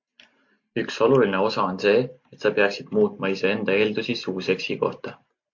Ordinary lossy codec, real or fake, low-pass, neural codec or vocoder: MP3, 48 kbps; real; 7.2 kHz; none